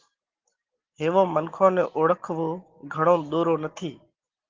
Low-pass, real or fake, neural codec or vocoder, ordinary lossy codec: 7.2 kHz; real; none; Opus, 16 kbps